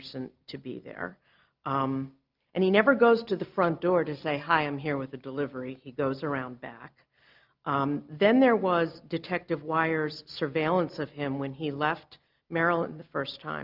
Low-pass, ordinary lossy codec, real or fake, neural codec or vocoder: 5.4 kHz; Opus, 24 kbps; real; none